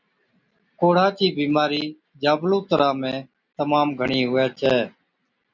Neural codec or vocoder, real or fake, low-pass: none; real; 7.2 kHz